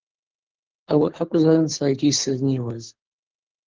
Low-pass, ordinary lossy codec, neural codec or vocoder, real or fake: 7.2 kHz; Opus, 16 kbps; codec, 24 kHz, 6 kbps, HILCodec; fake